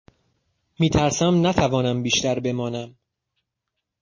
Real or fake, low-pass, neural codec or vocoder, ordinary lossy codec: real; 7.2 kHz; none; MP3, 32 kbps